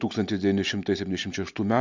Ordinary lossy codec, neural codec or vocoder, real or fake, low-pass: MP3, 64 kbps; none; real; 7.2 kHz